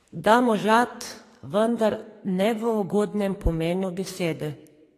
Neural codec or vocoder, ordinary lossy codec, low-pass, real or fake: codec, 44.1 kHz, 2.6 kbps, SNAC; AAC, 48 kbps; 14.4 kHz; fake